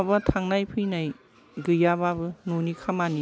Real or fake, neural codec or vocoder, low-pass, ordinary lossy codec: real; none; none; none